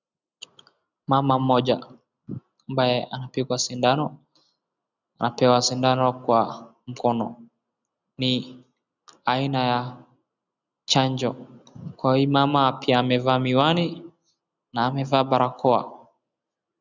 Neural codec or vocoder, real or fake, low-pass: none; real; 7.2 kHz